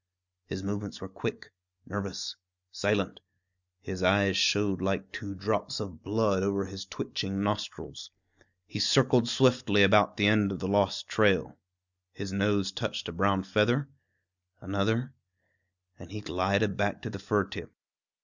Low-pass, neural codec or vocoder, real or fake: 7.2 kHz; none; real